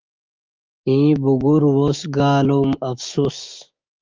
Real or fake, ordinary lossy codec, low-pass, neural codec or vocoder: fake; Opus, 24 kbps; 7.2 kHz; codec, 16 kHz, 6 kbps, DAC